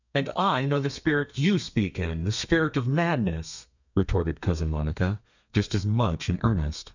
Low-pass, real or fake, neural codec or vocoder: 7.2 kHz; fake; codec, 32 kHz, 1.9 kbps, SNAC